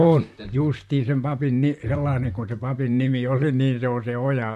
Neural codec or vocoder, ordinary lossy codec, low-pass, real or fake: vocoder, 44.1 kHz, 128 mel bands, Pupu-Vocoder; MP3, 64 kbps; 14.4 kHz; fake